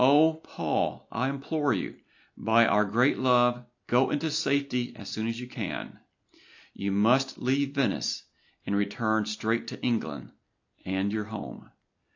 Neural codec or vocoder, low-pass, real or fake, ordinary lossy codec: none; 7.2 kHz; real; AAC, 48 kbps